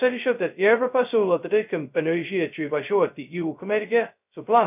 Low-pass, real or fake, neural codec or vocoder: 3.6 kHz; fake; codec, 16 kHz, 0.2 kbps, FocalCodec